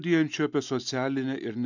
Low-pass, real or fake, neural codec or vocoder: 7.2 kHz; real; none